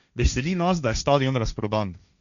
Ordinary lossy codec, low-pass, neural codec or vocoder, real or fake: none; 7.2 kHz; codec, 16 kHz, 1.1 kbps, Voila-Tokenizer; fake